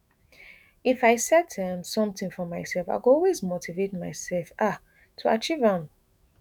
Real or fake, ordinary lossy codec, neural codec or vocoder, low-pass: fake; none; autoencoder, 48 kHz, 128 numbers a frame, DAC-VAE, trained on Japanese speech; none